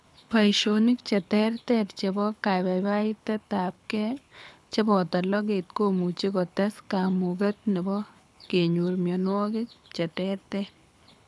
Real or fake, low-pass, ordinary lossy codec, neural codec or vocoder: fake; none; none; codec, 24 kHz, 6 kbps, HILCodec